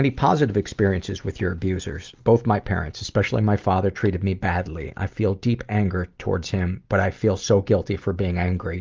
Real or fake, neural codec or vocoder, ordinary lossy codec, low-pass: real; none; Opus, 32 kbps; 7.2 kHz